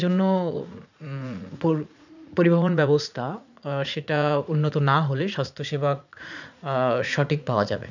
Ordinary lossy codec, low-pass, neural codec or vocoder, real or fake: none; 7.2 kHz; vocoder, 44.1 kHz, 80 mel bands, Vocos; fake